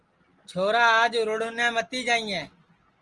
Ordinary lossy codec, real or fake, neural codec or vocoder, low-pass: Opus, 24 kbps; real; none; 10.8 kHz